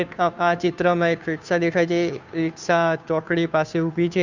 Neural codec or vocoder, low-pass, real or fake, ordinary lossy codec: codec, 16 kHz, 2 kbps, FunCodec, trained on Chinese and English, 25 frames a second; 7.2 kHz; fake; none